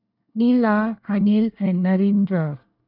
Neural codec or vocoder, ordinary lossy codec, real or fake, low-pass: codec, 24 kHz, 1 kbps, SNAC; none; fake; 5.4 kHz